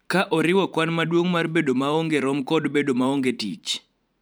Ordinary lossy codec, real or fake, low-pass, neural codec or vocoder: none; fake; none; vocoder, 44.1 kHz, 128 mel bands every 512 samples, BigVGAN v2